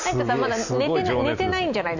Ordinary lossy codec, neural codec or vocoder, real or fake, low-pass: none; none; real; 7.2 kHz